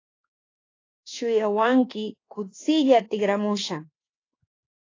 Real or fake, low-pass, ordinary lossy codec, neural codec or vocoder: fake; 7.2 kHz; AAC, 32 kbps; codec, 24 kHz, 1.2 kbps, DualCodec